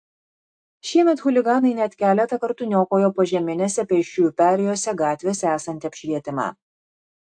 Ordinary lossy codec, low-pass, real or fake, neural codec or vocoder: AAC, 64 kbps; 9.9 kHz; real; none